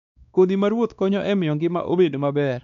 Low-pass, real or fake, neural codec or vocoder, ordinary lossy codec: 7.2 kHz; fake; codec, 16 kHz, 2 kbps, X-Codec, WavLM features, trained on Multilingual LibriSpeech; none